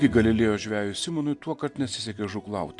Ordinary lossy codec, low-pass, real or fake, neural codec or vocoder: AAC, 64 kbps; 10.8 kHz; real; none